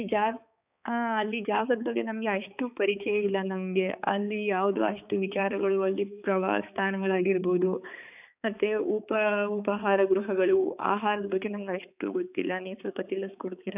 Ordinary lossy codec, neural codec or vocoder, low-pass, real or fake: none; codec, 16 kHz, 4 kbps, X-Codec, HuBERT features, trained on balanced general audio; 3.6 kHz; fake